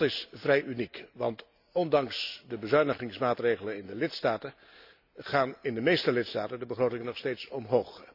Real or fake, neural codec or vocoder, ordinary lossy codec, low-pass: real; none; none; 5.4 kHz